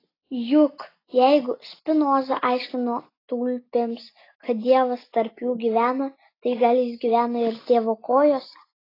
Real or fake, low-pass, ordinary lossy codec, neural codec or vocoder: real; 5.4 kHz; AAC, 24 kbps; none